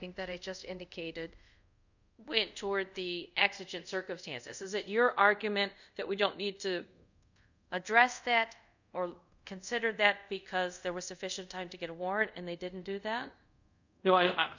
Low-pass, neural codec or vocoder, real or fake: 7.2 kHz; codec, 24 kHz, 0.5 kbps, DualCodec; fake